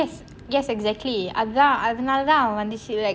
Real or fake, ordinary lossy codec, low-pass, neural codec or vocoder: real; none; none; none